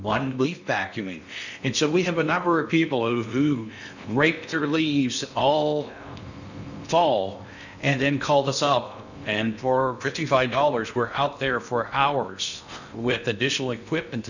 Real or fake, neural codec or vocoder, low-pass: fake; codec, 16 kHz in and 24 kHz out, 0.6 kbps, FocalCodec, streaming, 4096 codes; 7.2 kHz